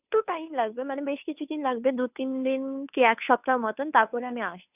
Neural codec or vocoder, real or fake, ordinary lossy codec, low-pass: codec, 16 kHz, 2 kbps, FunCodec, trained on Chinese and English, 25 frames a second; fake; none; 3.6 kHz